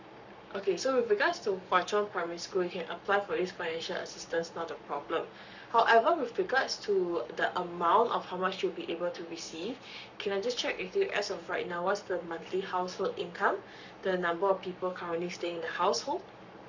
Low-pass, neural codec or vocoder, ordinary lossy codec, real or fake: 7.2 kHz; codec, 44.1 kHz, 7.8 kbps, Pupu-Codec; none; fake